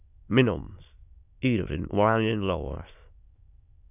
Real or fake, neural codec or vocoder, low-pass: fake; autoencoder, 22.05 kHz, a latent of 192 numbers a frame, VITS, trained on many speakers; 3.6 kHz